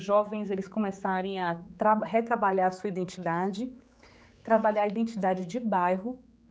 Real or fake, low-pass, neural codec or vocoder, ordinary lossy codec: fake; none; codec, 16 kHz, 4 kbps, X-Codec, HuBERT features, trained on general audio; none